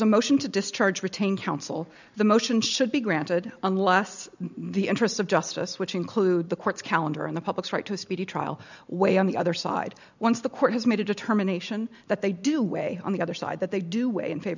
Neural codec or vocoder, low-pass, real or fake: none; 7.2 kHz; real